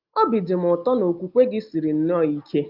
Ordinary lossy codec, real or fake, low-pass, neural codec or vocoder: Opus, 32 kbps; real; 5.4 kHz; none